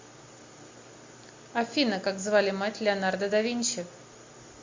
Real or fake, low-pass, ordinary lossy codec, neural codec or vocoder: real; 7.2 kHz; MP3, 48 kbps; none